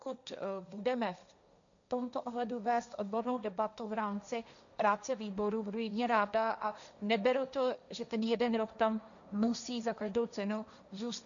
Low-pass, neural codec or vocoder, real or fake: 7.2 kHz; codec, 16 kHz, 1.1 kbps, Voila-Tokenizer; fake